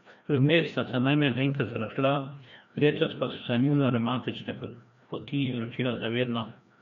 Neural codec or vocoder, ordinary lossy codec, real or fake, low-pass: codec, 16 kHz, 1 kbps, FreqCodec, larger model; MP3, 64 kbps; fake; 7.2 kHz